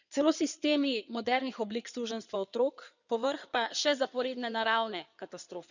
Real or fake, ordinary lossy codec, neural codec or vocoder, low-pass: fake; none; codec, 16 kHz in and 24 kHz out, 2.2 kbps, FireRedTTS-2 codec; 7.2 kHz